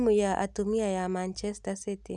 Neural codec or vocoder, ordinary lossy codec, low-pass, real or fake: none; none; none; real